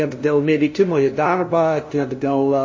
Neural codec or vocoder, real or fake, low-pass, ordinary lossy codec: codec, 16 kHz, 0.5 kbps, FunCodec, trained on LibriTTS, 25 frames a second; fake; 7.2 kHz; MP3, 32 kbps